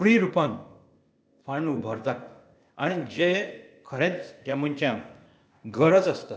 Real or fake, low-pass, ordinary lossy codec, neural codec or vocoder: fake; none; none; codec, 16 kHz, 0.8 kbps, ZipCodec